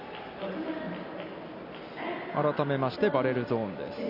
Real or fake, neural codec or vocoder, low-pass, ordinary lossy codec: real; none; 5.4 kHz; none